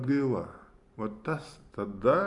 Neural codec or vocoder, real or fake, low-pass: vocoder, 44.1 kHz, 128 mel bands every 512 samples, BigVGAN v2; fake; 10.8 kHz